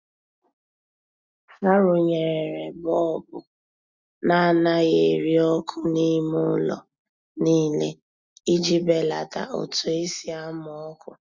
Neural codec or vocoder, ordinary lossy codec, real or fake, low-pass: none; Opus, 64 kbps; real; 7.2 kHz